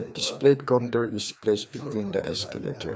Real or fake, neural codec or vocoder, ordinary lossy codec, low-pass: fake; codec, 16 kHz, 2 kbps, FreqCodec, larger model; none; none